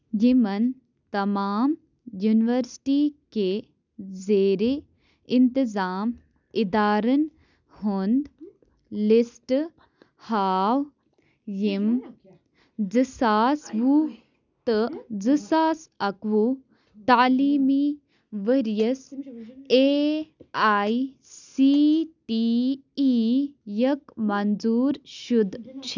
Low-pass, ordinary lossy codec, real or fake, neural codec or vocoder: 7.2 kHz; none; real; none